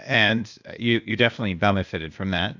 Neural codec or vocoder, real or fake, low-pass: codec, 16 kHz, 0.8 kbps, ZipCodec; fake; 7.2 kHz